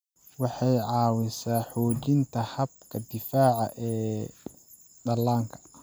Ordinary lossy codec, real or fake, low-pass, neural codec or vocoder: none; real; none; none